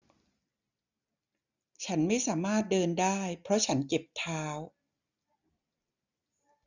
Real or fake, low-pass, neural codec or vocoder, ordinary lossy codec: real; 7.2 kHz; none; none